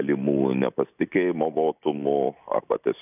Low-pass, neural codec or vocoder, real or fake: 3.6 kHz; none; real